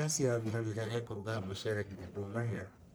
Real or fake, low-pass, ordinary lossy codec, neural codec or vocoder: fake; none; none; codec, 44.1 kHz, 1.7 kbps, Pupu-Codec